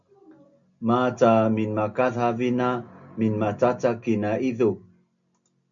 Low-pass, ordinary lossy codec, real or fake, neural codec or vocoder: 7.2 kHz; MP3, 64 kbps; real; none